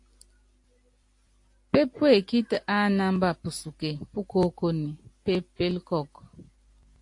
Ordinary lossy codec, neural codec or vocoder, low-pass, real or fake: AAC, 48 kbps; none; 10.8 kHz; real